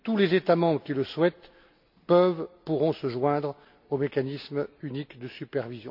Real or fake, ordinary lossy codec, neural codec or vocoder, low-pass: real; none; none; 5.4 kHz